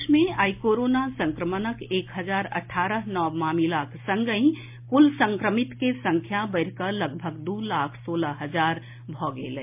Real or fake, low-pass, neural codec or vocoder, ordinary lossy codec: real; 3.6 kHz; none; MP3, 32 kbps